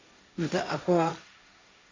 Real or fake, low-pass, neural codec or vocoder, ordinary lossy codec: fake; 7.2 kHz; codec, 16 kHz, 1.1 kbps, Voila-Tokenizer; MP3, 64 kbps